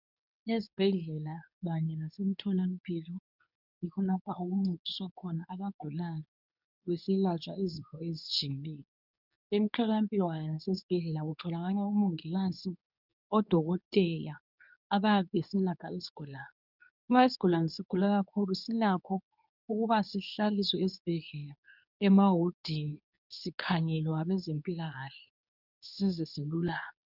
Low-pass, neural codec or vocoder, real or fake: 5.4 kHz; codec, 24 kHz, 0.9 kbps, WavTokenizer, medium speech release version 2; fake